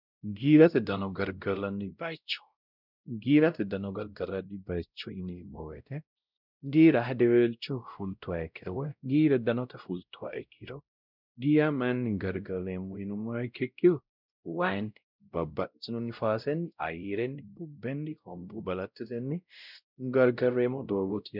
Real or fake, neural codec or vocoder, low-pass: fake; codec, 16 kHz, 0.5 kbps, X-Codec, WavLM features, trained on Multilingual LibriSpeech; 5.4 kHz